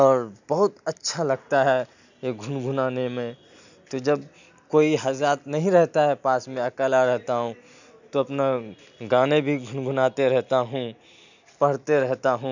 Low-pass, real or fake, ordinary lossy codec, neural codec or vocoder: 7.2 kHz; real; none; none